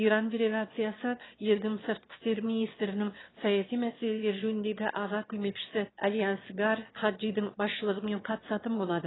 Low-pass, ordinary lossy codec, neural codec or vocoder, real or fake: 7.2 kHz; AAC, 16 kbps; autoencoder, 22.05 kHz, a latent of 192 numbers a frame, VITS, trained on one speaker; fake